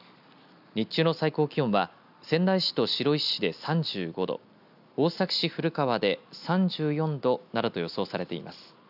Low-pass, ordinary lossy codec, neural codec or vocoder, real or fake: 5.4 kHz; none; none; real